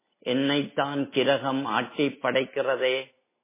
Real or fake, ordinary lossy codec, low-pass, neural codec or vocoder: real; MP3, 16 kbps; 3.6 kHz; none